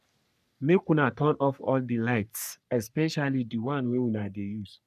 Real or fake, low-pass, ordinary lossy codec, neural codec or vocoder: fake; 14.4 kHz; none; codec, 44.1 kHz, 3.4 kbps, Pupu-Codec